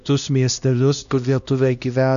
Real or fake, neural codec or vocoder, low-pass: fake; codec, 16 kHz, 1 kbps, X-Codec, HuBERT features, trained on LibriSpeech; 7.2 kHz